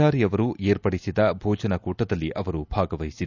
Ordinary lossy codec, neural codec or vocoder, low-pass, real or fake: none; none; 7.2 kHz; real